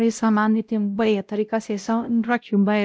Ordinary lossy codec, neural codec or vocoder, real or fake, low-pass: none; codec, 16 kHz, 0.5 kbps, X-Codec, WavLM features, trained on Multilingual LibriSpeech; fake; none